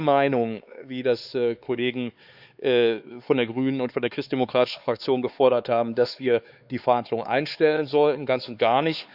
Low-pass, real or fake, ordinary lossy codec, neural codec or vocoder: 5.4 kHz; fake; Opus, 64 kbps; codec, 16 kHz, 4 kbps, X-Codec, HuBERT features, trained on LibriSpeech